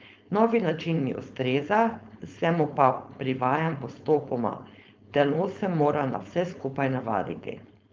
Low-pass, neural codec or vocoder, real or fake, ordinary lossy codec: 7.2 kHz; codec, 16 kHz, 4.8 kbps, FACodec; fake; Opus, 32 kbps